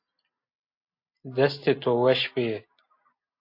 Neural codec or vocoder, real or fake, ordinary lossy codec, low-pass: none; real; MP3, 32 kbps; 5.4 kHz